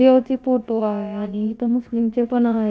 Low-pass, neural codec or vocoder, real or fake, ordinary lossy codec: none; codec, 16 kHz, about 1 kbps, DyCAST, with the encoder's durations; fake; none